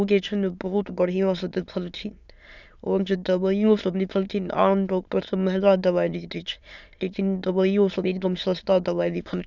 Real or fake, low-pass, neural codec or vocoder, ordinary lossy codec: fake; 7.2 kHz; autoencoder, 22.05 kHz, a latent of 192 numbers a frame, VITS, trained on many speakers; none